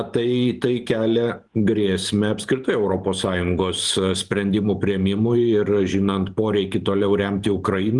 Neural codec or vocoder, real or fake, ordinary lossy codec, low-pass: vocoder, 44.1 kHz, 128 mel bands every 512 samples, BigVGAN v2; fake; Opus, 24 kbps; 10.8 kHz